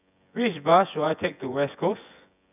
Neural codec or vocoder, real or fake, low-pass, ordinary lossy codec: vocoder, 24 kHz, 100 mel bands, Vocos; fake; 3.6 kHz; none